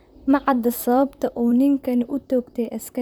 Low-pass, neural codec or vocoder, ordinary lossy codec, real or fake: none; vocoder, 44.1 kHz, 128 mel bands, Pupu-Vocoder; none; fake